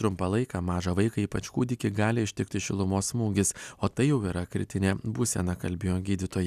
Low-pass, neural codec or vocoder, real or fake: 14.4 kHz; none; real